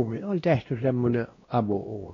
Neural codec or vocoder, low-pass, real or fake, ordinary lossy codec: codec, 16 kHz, 1 kbps, X-Codec, WavLM features, trained on Multilingual LibriSpeech; 7.2 kHz; fake; AAC, 32 kbps